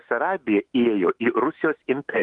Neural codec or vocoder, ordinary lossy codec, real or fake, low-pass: autoencoder, 48 kHz, 128 numbers a frame, DAC-VAE, trained on Japanese speech; Opus, 24 kbps; fake; 10.8 kHz